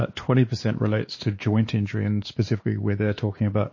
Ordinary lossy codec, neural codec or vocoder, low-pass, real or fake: MP3, 32 kbps; codec, 16 kHz, 2 kbps, X-Codec, WavLM features, trained on Multilingual LibriSpeech; 7.2 kHz; fake